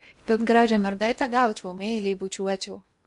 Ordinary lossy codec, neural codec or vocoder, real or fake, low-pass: MP3, 64 kbps; codec, 16 kHz in and 24 kHz out, 0.8 kbps, FocalCodec, streaming, 65536 codes; fake; 10.8 kHz